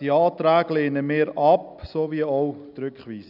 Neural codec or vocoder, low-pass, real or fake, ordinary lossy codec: none; 5.4 kHz; real; none